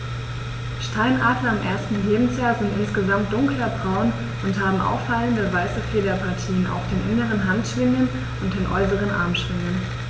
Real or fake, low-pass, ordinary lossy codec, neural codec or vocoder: real; none; none; none